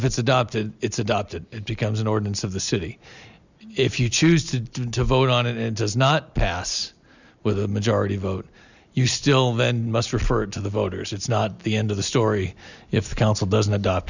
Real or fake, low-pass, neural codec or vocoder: real; 7.2 kHz; none